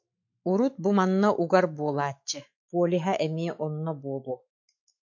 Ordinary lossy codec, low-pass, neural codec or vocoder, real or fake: MP3, 48 kbps; 7.2 kHz; autoencoder, 48 kHz, 128 numbers a frame, DAC-VAE, trained on Japanese speech; fake